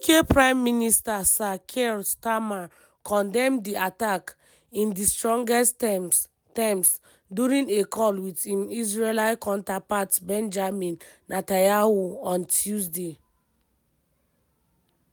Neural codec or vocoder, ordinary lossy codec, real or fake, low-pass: none; none; real; none